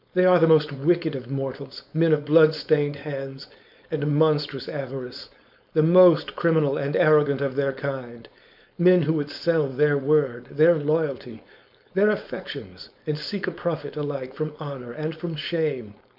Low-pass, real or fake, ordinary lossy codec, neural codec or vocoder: 5.4 kHz; fake; MP3, 48 kbps; codec, 16 kHz, 4.8 kbps, FACodec